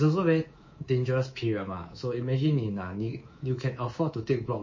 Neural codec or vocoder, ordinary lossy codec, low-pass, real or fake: codec, 24 kHz, 3.1 kbps, DualCodec; MP3, 32 kbps; 7.2 kHz; fake